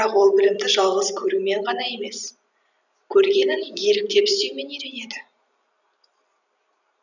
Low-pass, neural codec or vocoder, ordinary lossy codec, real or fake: 7.2 kHz; vocoder, 44.1 kHz, 128 mel bands every 256 samples, BigVGAN v2; none; fake